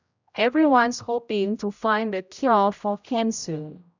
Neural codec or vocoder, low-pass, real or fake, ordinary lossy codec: codec, 16 kHz, 0.5 kbps, X-Codec, HuBERT features, trained on general audio; 7.2 kHz; fake; none